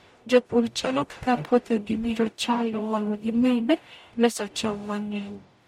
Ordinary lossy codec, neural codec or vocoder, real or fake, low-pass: MP3, 64 kbps; codec, 44.1 kHz, 0.9 kbps, DAC; fake; 19.8 kHz